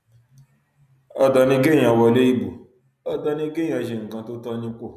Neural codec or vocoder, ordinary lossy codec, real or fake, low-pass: none; none; real; 14.4 kHz